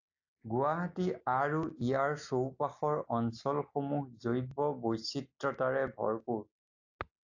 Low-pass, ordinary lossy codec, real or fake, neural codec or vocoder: 7.2 kHz; AAC, 48 kbps; real; none